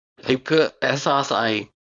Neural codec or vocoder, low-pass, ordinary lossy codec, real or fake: codec, 24 kHz, 0.9 kbps, WavTokenizer, small release; 9.9 kHz; MP3, 96 kbps; fake